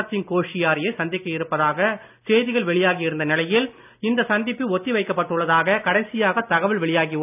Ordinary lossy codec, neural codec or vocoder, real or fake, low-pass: none; none; real; 3.6 kHz